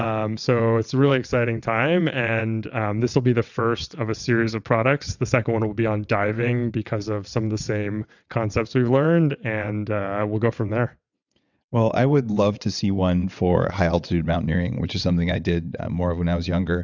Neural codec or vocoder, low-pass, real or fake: vocoder, 22.05 kHz, 80 mel bands, WaveNeXt; 7.2 kHz; fake